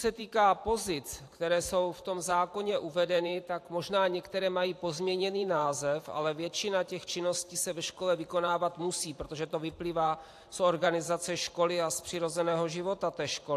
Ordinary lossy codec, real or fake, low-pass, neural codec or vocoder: AAC, 64 kbps; fake; 14.4 kHz; vocoder, 44.1 kHz, 128 mel bands every 512 samples, BigVGAN v2